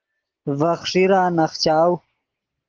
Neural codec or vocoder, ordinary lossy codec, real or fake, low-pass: none; Opus, 16 kbps; real; 7.2 kHz